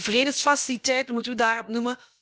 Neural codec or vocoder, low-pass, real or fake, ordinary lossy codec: codec, 16 kHz, about 1 kbps, DyCAST, with the encoder's durations; none; fake; none